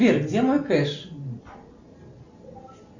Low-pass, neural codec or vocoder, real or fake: 7.2 kHz; none; real